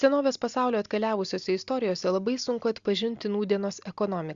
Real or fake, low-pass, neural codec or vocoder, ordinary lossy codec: real; 7.2 kHz; none; Opus, 64 kbps